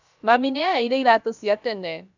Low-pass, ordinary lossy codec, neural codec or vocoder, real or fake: 7.2 kHz; none; codec, 16 kHz, about 1 kbps, DyCAST, with the encoder's durations; fake